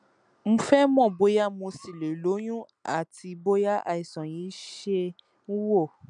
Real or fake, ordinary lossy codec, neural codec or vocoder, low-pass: real; none; none; 9.9 kHz